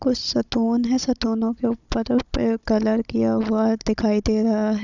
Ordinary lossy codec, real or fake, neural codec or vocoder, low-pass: none; fake; codec, 16 kHz, 16 kbps, FunCodec, trained on Chinese and English, 50 frames a second; 7.2 kHz